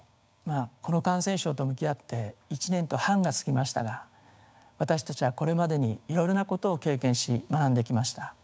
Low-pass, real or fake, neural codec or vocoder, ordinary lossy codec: none; fake; codec, 16 kHz, 6 kbps, DAC; none